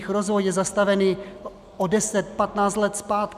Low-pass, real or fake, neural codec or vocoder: 14.4 kHz; real; none